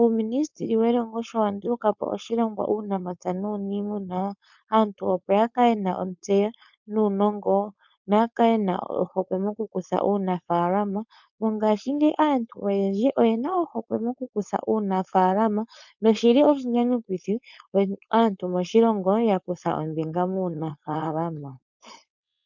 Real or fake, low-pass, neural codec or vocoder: fake; 7.2 kHz; codec, 16 kHz, 4.8 kbps, FACodec